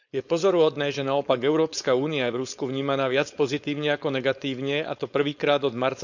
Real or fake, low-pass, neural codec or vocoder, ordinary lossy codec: fake; 7.2 kHz; codec, 16 kHz, 4.8 kbps, FACodec; none